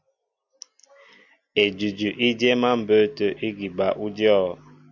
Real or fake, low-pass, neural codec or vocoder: real; 7.2 kHz; none